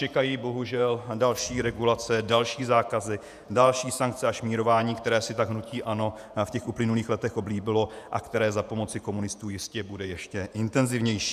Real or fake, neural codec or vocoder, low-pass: fake; vocoder, 48 kHz, 128 mel bands, Vocos; 14.4 kHz